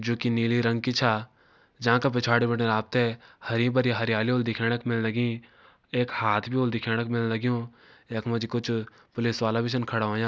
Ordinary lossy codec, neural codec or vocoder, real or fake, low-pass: none; none; real; none